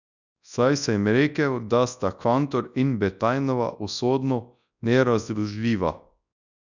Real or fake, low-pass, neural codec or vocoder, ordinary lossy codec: fake; 7.2 kHz; codec, 24 kHz, 0.9 kbps, WavTokenizer, large speech release; none